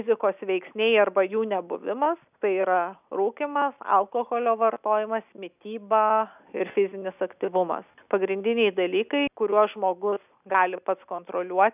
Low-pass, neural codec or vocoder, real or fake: 3.6 kHz; none; real